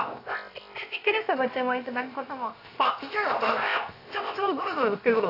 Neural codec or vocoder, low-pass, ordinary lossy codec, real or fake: codec, 16 kHz, 0.7 kbps, FocalCodec; 5.4 kHz; AAC, 32 kbps; fake